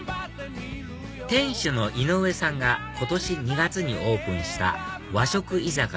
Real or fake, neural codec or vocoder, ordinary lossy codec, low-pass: real; none; none; none